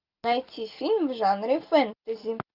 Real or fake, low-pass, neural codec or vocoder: real; 5.4 kHz; none